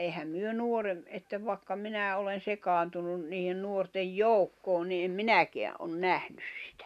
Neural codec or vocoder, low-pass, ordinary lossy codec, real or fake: none; 14.4 kHz; none; real